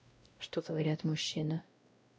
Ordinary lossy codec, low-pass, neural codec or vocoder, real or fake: none; none; codec, 16 kHz, 0.5 kbps, X-Codec, WavLM features, trained on Multilingual LibriSpeech; fake